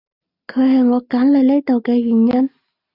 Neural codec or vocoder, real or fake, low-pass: none; real; 5.4 kHz